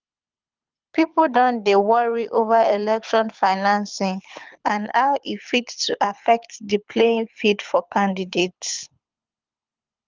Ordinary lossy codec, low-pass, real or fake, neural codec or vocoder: Opus, 32 kbps; 7.2 kHz; fake; codec, 24 kHz, 6 kbps, HILCodec